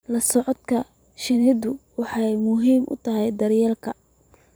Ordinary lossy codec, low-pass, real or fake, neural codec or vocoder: none; none; fake; vocoder, 44.1 kHz, 128 mel bands every 512 samples, BigVGAN v2